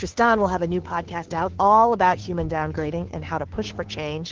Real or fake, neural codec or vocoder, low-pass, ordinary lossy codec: fake; codec, 16 kHz, 2 kbps, FunCodec, trained on Chinese and English, 25 frames a second; 7.2 kHz; Opus, 16 kbps